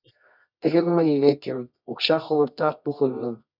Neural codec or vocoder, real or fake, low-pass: codec, 24 kHz, 0.9 kbps, WavTokenizer, medium music audio release; fake; 5.4 kHz